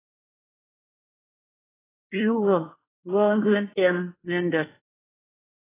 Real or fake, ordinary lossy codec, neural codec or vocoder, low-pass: fake; AAC, 16 kbps; codec, 24 kHz, 1 kbps, SNAC; 3.6 kHz